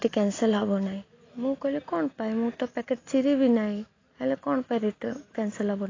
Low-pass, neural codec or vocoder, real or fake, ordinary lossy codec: 7.2 kHz; none; real; AAC, 32 kbps